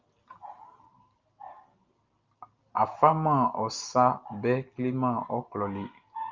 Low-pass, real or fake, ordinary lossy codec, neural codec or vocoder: 7.2 kHz; real; Opus, 32 kbps; none